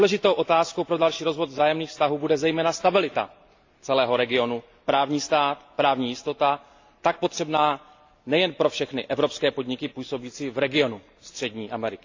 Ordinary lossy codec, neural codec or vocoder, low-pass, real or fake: AAC, 48 kbps; none; 7.2 kHz; real